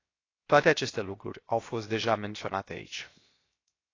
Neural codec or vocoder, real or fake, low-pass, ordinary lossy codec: codec, 16 kHz, 0.7 kbps, FocalCodec; fake; 7.2 kHz; AAC, 32 kbps